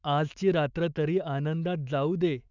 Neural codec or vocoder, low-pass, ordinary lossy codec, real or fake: autoencoder, 48 kHz, 128 numbers a frame, DAC-VAE, trained on Japanese speech; 7.2 kHz; none; fake